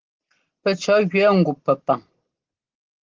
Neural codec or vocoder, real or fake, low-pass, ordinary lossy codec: none; real; 7.2 kHz; Opus, 16 kbps